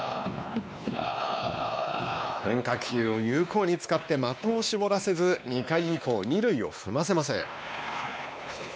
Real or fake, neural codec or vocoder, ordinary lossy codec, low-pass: fake; codec, 16 kHz, 2 kbps, X-Codec, WavLM features, trained on Multilingual LibriSpeech; none; none